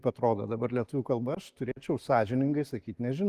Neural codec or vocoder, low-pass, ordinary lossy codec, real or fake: none; 14.4 kHz; Opus, 24 kbps; real